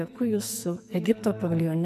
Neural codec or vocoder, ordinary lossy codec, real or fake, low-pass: codec, 44.1 kHz, 2.6 kbps, SNAC; MP3, 96 kbps; fake; 14.4 kHz